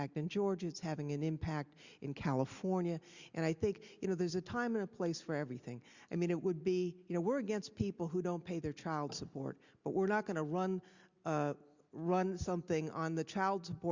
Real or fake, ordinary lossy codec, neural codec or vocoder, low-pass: real; Opus, 64 kbps; none; 7.2 kHz